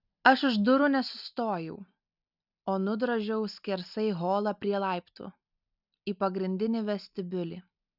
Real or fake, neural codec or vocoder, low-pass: real; none; 5.4 kHz